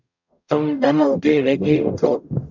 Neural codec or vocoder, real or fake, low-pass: codec, 44.1 kHz, 0.9 kbps, DAC; fake; 7.2 kHz